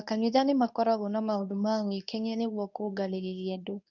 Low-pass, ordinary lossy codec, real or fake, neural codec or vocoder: 7.2 kHz; none; fake; codec, 24 kHz, 0.9 kbps, WavTokenizer, medium speech release version 2